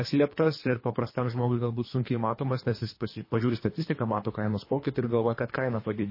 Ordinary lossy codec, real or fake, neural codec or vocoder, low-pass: MP3, 24 kbps; fake; codec, 24 kHz, 3 kbps, HILCodec; 5.4 kHz